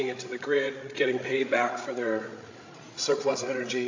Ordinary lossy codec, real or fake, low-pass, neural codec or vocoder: AAC, 48 kbps; fake; 7.2 kHz; codec, 16 kHz, 16 kbps, FreqCodec, larger model